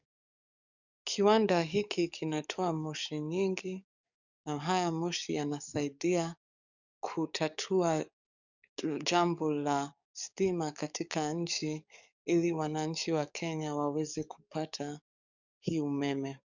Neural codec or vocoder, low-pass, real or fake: codec, 44.1 kHz, 7.8 kbps, DAC; 7.2 kHz; fake